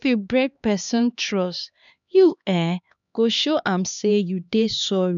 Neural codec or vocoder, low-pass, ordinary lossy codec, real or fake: codec, 16 kHz, 2 kbps, X-Codec, HuBERT features, trained on LibriSpeech; 7.2 kHz; none; fake